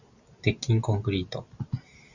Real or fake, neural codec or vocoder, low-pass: real; none; 7.2 kHz